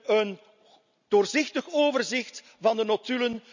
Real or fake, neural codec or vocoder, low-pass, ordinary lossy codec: real; none; 7.2 kHz; none